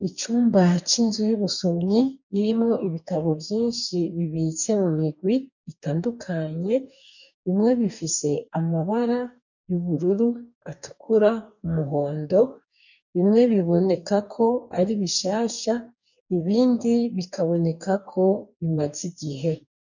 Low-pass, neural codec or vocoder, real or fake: 7.2 kHz; codec, 44.1 kHz, 2.6 kbps, DAC; fake